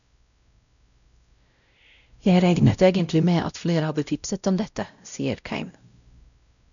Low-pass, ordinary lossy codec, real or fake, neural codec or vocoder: 7.2 kHz; none; fake; codec, 16 kHz, 0.5 kbps, X-Codec, WavLM features, trained on Multilingual LibriSpeech